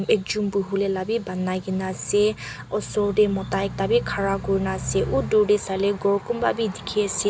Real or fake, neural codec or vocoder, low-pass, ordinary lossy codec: real; none; none; none